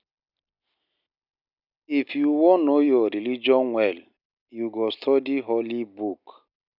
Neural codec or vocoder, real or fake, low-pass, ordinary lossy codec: none; real; 5.4 kHz; none